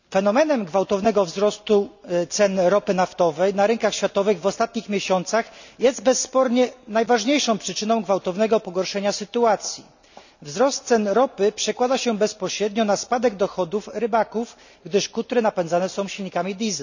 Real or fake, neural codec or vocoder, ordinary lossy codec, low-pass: real; none; none; 7.2 kHz